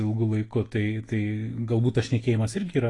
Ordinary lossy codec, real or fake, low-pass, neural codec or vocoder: AAC, 32 kbps; fake; 10.8 kHz; autoencoder, 48 kHz, 128 numbers a frame, DAC-VAE, trained on Japanese speech